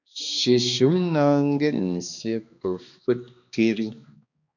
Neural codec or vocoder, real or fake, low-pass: codec, 16 kHz, 2 kbps, X-Codec, HuBERT features, trained on balanced general audio; fake; 7.2 kHz